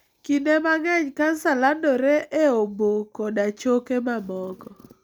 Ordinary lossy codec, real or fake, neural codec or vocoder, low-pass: none; real; none; none